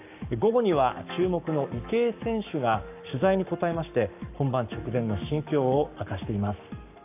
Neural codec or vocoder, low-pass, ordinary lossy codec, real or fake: codec, 44.1 kHz, 7.8 kbps, Pupu-Codec; 3.6 kHz; AAC, 32 kbps; fake